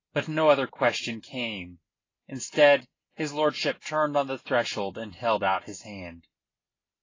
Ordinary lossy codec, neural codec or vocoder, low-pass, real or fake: AAC, 32 kbps; none; 7.2 kHz; real